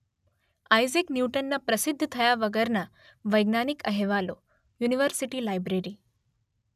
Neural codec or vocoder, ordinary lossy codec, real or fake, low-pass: none; none; real; 14.4 kHz